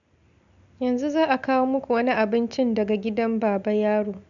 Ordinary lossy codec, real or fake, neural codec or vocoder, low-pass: none; real; none; 7.2 kHz